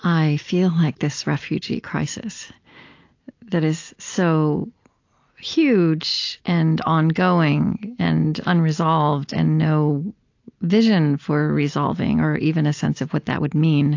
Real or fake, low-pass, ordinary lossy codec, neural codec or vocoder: real; 7.2 kHz; AAC, 48 kbps; none